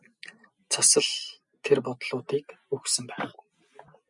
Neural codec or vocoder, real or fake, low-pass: none; real; 10.8 kHz